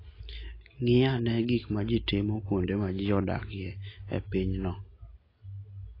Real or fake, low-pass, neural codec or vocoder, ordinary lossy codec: real; 5.4 kHz; none; AAC, 24 kbps